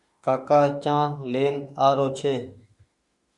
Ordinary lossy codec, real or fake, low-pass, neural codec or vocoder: Opus, 64 kbps; fake; 10.8 kHz; autoencoder, 48 kHz, 32 numbers a frame, DAC-VAE, trained on Japanese speech